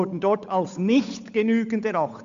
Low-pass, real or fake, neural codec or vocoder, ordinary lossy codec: 7.2 kHz; fake; codec, 16 kHz, 8 kbps, FunCodec, trained on Chinese and English, 25 frames a second; MP3, 64 kbps